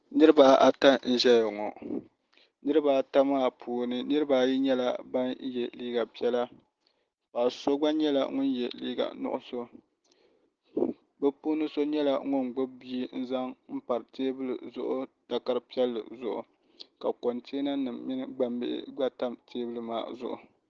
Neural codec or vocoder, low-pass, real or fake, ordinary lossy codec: none; 7.2 kHz; real; Opus, 16 kbps